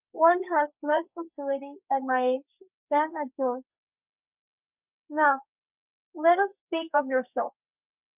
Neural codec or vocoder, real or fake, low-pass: codec, 32 kHz, 1.9 kbps, SNAC; fake; 3.6 kHz